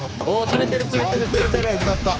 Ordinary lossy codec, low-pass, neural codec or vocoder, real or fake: none; none; codec, 16 kHz, 4 kbps, X-Codec, HuBERT features, trained on general audio; fake